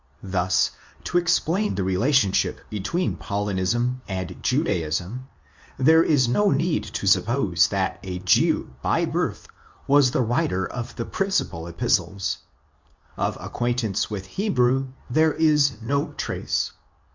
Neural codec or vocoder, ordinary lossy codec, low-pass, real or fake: codec, 24 kHz, 0.9 kbps, WavTokenizer, medium speech release version 2; MP3, 64 kbps; 7.2 kHz; fake